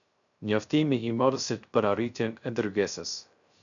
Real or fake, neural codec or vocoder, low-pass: fake; codec, 16 kHz, 0.3 kbps, FocalCodec; 7.2 kHz